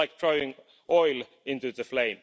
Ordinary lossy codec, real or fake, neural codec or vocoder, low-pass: none; real; none; none